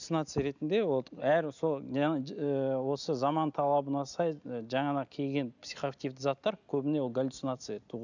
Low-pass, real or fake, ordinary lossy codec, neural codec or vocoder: 7.2 kHz; real; none; none